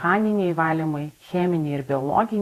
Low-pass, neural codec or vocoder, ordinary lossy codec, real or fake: 14.4 kHz; vocoder, 48 kHz, 128 mel bands, Vocos; AAC, 48 kbps; fake